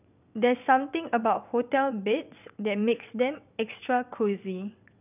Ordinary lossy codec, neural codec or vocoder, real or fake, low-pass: none; vocoder, 22.05 kHz, 80 mel bands, WaveNeXt; fake; 3.6 kHz